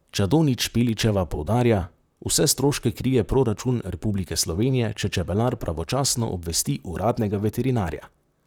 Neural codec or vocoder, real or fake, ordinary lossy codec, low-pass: vocoder, 44.1 kHz, 128 mel bands, Pupu-Vocoder; fake; none; none